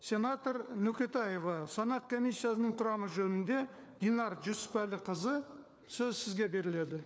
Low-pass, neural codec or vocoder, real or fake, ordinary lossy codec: none; codec, 16 kHz, 4 kbps, FunCodec, trained on LibriTTS, 50 frames a second; fake; none